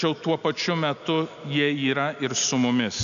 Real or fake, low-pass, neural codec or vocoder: real; 7.2 kHz; none